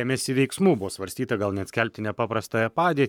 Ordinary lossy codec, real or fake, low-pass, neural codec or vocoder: MP3, 96 kbps; fake; 19.8 kHz; codec, 44.1 kHz, 7.8 kbps, Pupu-Codec